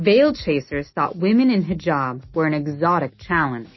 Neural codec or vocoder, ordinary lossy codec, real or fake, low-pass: none; MP3, 24 kbps; real; 7.2 kHz